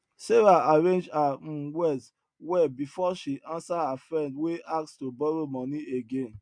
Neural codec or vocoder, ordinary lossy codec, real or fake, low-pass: none; MP3, 64 kbps; real; 9.9 kHz